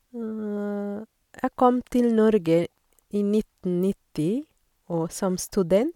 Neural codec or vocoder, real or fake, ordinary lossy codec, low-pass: none; real; MP3, 96 kbps; 19.8 kHz